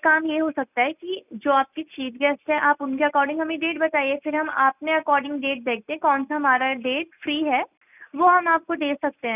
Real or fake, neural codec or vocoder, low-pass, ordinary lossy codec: real; none; 3.6 kHz; none